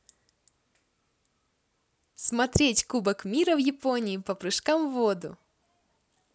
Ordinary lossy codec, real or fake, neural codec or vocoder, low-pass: none; real; none; none